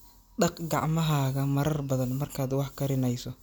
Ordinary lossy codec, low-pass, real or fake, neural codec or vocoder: none; none; real; none